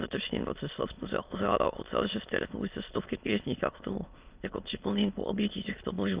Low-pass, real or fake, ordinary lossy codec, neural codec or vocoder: 3.6 kHz; fake; Opus, 24 kbps; autoencoder, 22.05 kHz, a latent of 192 numbers a frame, VITS, trained on many speakers